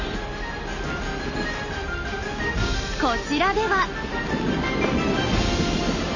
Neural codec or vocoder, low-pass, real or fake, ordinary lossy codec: none; 7.2 kHz; real; none